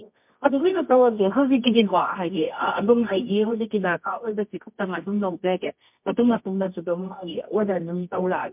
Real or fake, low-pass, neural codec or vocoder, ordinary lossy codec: fake; 3.6 kHz; codec, 24 kHz, 0.9 kbps, WavTokenizer, medium music audio release; MP3, 32 kbps